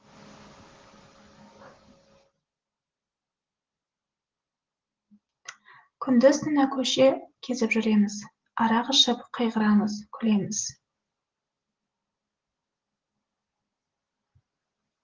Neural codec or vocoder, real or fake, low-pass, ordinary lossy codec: none; real; 7.2 kHz; Opus, 16 kbps